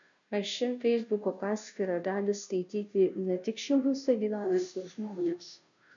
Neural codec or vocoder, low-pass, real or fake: codec, 16 kHz, 0.5 kbps, FunCodec, trained on Chinese and English, 25 frames a second; 7.2 kHz; fake